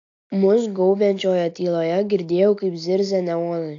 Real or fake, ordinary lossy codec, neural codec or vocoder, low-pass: real; AAC, 48 kbps; none; 7.2 kHz